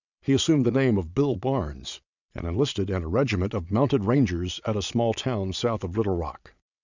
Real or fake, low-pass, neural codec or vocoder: fake; 7.2 kHz; vocoder, 22.05 kHz, 80 mel bands, Vocos